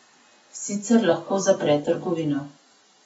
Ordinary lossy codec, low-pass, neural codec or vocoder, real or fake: AAC, 24 kbps; 19.8 kHz; none; real